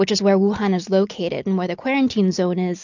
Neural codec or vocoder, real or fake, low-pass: none; real; 7.2 kHz